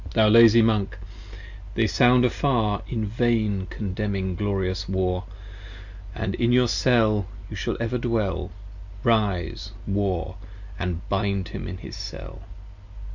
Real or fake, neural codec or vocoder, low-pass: real; none; 7.2 kHz